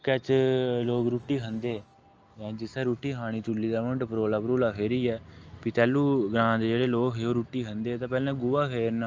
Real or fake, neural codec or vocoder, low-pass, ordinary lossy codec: real; none; 7.2 kHz; Opus, 24 kbps